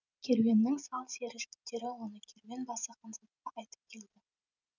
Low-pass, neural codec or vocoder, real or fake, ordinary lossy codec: 7.2 kHz; none; real; none